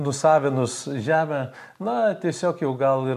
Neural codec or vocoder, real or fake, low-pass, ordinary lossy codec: none; real; 14.4 kHz; MP3, 96 kbps